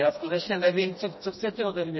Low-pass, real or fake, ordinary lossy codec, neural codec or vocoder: 7.2 kHz; fake; MP3, 24 kbps; codec, 16 kHz, 1 kbps, FreqCodec, smaller model